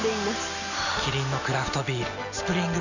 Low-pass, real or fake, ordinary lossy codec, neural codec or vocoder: 7.2 kHz; real; none; none